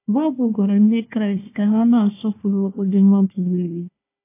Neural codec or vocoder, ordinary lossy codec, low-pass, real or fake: codec, 16 kHz, 1 kbps, FunCodec, trained on Chinese and English, 50 frames a second; AAC, 24 kbps; 3.6 kHz; fake